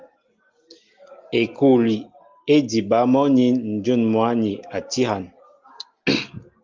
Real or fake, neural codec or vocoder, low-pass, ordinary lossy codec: real; none; 7.2 kHz; Opus, 32 kbps